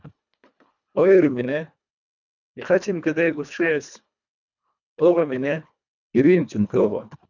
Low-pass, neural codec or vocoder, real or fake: 7.2 kHz; codec, 24 kHz, 1.5 kbps, HILCodec; fake